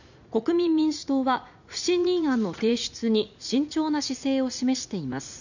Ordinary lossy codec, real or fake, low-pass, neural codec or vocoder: none; fake; 7.2 kHz; vocoder, 44.1 kHz, 80 mel bands, Vocos